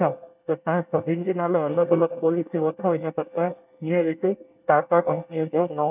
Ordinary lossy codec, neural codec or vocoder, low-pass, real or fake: none; codec, 24 kHz, 1 kbps, SNAC; 3.6 kHz; fake